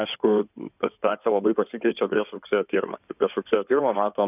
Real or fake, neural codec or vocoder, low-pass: fake; codec, 16 kHz in and 24 kHz out, 2.2 kbps, FireRedTTS-2 codec; 3.6 kHz